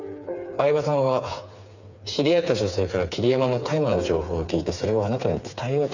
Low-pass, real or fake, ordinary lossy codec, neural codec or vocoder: 7.2 kHz; fake; none; codec, 16 kHz, 4 kbps, FreqCodec, smaller model